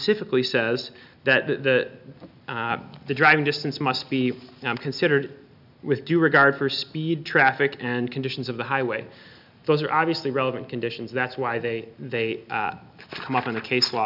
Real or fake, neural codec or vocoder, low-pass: real; none; 5.4 kHz